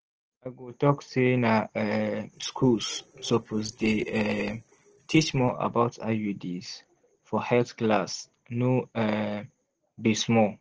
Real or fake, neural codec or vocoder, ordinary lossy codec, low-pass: real; none; Opus, 16 kbps; 7.2 kHz